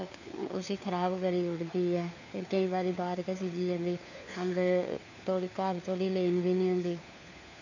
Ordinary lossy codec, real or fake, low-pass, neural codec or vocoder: none; fake; 7.2 kHz; codec, 16 kHz, 4 kbps, FunCodec, trained on LibriTTS, 50 frames a second